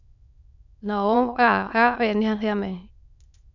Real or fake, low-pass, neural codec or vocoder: fake; 7.2 kHz; autoencoder, 22.05 kHz, a latent of 192 numbers a frame, VITS, trained on many speakers